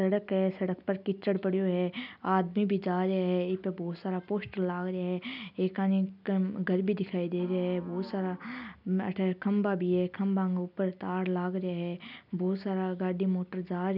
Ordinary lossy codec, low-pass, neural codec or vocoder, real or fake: none; 5.4 kHz; none; real